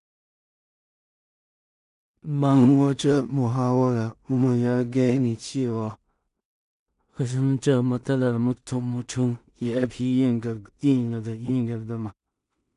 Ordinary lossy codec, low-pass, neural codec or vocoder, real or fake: none; 10.8 kHz; codec, 16 kHz in and 24 kHz out, 0.4 kbps, LongCat-Audio-Codec, two codebook decoder; fake